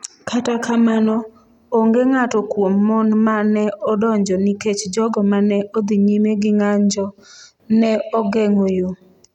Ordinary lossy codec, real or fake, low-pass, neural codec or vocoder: none; real; 19.8 kHz; none